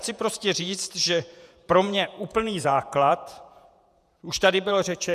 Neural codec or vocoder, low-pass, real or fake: vocoder, 48 kHz, 128 mel bands, Vocos; 14.4 kHz; fake